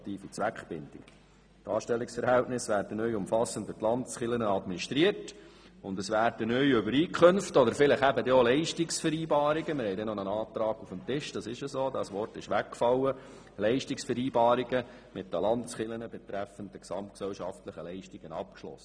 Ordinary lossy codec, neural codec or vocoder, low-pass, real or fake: none; none; 9.9 kHz; real